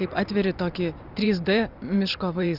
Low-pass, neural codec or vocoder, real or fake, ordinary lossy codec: 5.4 kHz; vocoder, 44.1 kHz, 128 mel bands every 512 samples, BigVGAN v2; fake; Opus, 64 kbps